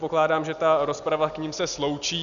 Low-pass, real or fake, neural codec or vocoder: 7.2 kHz; real; none